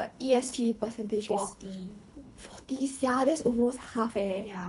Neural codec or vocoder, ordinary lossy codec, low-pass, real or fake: codec, 24 kHz, 3 kbps, HILCodec; none; 10.8 kHz; fake